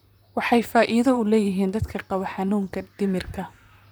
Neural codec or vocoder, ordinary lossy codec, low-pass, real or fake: vocoder, 44.1 kHz, 128 mel bands, Pupu-Vocoder; none; none; fake